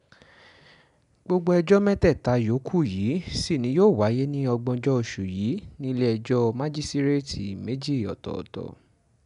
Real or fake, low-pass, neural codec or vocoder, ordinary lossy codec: real; 10.8 kHz; none; none